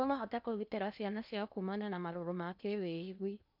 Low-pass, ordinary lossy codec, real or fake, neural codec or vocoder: 5.4 kHz; none; fake; codec, 16 kHz in and 24 kHz out, 0.8 kbps, FocalCodec, streaming, 65536 codes